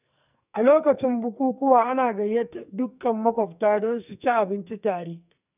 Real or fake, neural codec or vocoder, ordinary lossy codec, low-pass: fake; codec, 44.1 kHz, 2.6 kbps, SNAC; none; 3.6 kHz